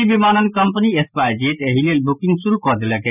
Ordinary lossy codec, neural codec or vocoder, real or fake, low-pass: none; none; real; 3.6 kHz